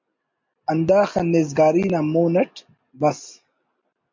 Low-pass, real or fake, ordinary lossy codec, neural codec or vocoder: 7.2 kHz; real; MP3, 48 kbps; none